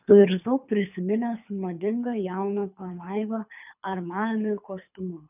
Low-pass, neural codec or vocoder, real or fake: 3.6 kHz; codec, 24 kHz, 3 kbps, HILCodec; fake